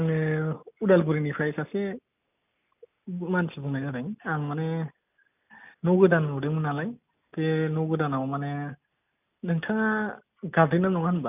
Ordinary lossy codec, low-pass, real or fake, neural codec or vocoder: none; 3.6 kHz; real; none